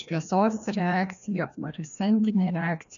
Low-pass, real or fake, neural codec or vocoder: 7.2 kHz; fake; codec, 16 kHz, 1 kbps, FreqCodec, larger model